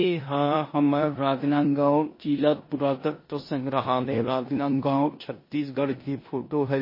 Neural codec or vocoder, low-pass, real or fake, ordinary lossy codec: codec, 16 kHz in and 24 kHz out, 0.9 kbps, LongCat-Audio-Codec, four codebook decoder; 5.4 kHz; fake; MP3, 24 kbps